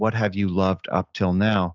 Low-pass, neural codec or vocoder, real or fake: 7.2 kHz; none; real